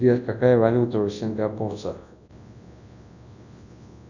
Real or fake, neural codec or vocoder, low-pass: fake; codec, 24 kHz, 0.9 kbps, WavTokenizer, large speech release; 7.2 kHz